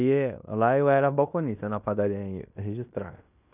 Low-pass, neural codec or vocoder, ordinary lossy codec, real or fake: 3.6 kHz; codec, 16 kHz in and 24 kHz out, 0.9 kbps, LongCat-Audio-Codec, fine tuned four codebook decoder; none; fake